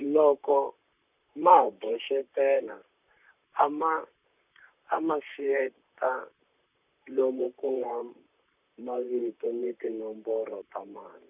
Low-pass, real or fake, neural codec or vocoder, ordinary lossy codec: 3.6 kHz; fake; vocoder, 44.1 kHz, 128 mel bands, Pupu-Vocoder; none